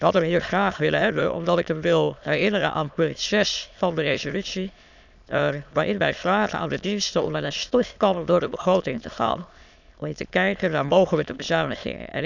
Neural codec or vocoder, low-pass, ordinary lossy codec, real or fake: autoencoder, 22.05 kHz, a latent of 192 numbers a frame, VITS, trained on many speakers; 7.2 kHz; none; fake